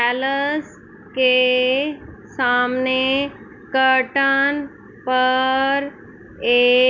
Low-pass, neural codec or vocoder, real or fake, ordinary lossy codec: 7.2 kHz; none; real; none